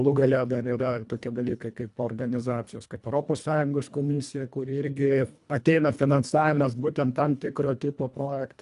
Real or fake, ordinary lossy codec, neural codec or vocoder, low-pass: fake; AAC, 96 kbps; codec, 24 kHz, 1.5 kbps, HILCodec; 10.8 kHz